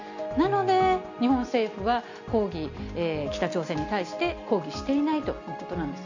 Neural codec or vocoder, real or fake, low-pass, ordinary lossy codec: none; real; 7.2 kHz; none